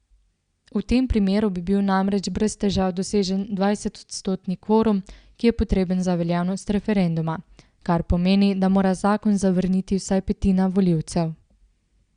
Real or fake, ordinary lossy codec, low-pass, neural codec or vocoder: real; Opus, 64 kbps; 9.9 kHz; none